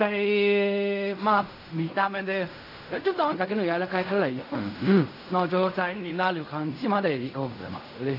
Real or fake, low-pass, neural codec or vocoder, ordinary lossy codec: fake; 5.4 kHz; codec, 16 kHz in and 24 kHz out, 0.4 kbps, LongCat-Audio-Codec, fine tuned four codebook decoder; AAC, 32 kbps